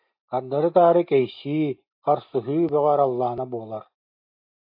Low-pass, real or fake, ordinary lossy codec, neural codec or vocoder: 5.4 kHz; real; AAC, 48 kbps; none